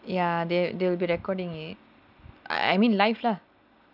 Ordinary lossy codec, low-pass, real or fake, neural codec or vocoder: none; 5.4 kHz; real; none